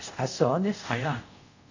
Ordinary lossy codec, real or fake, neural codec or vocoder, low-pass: none; fake; codec, 24 kHz, 0.5 kbps, DualCodec; 7.2 kHz